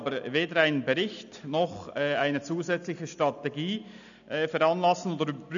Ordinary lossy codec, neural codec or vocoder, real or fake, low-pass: MP3, 96 kbps; none; real; 7.2 kHz